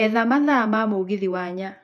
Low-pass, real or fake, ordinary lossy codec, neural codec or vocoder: 14.4 kHz; fake; none; vocoder, 48 kHz, 128 mel bands, Vocos